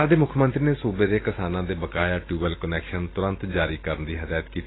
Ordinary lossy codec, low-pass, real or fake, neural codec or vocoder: AAC, 16 kbps; 7.2 kHz; real; none